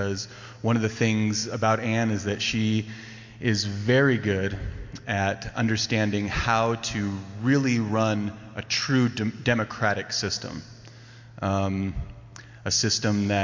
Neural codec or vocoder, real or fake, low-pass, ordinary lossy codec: none; real; 7.2 kHz; MP3, 48 kbps